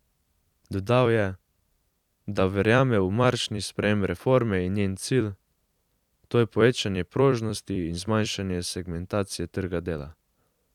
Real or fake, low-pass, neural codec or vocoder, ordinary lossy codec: fake; 19.8 kHz; vocoder, 44.1 kHz, 128 mel bands every 256 samples, BigVGAN v2; none